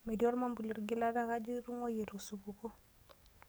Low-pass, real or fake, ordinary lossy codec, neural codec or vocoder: none; fake; none; codec, 44.1 kHz, 7.8 kbps, DAC